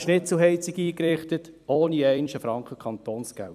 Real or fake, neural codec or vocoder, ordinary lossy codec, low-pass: fake; vocoder, 44.1 kHz, 128 mel bands every 256 samples, BigVGAN v2; none; 14.4 kHz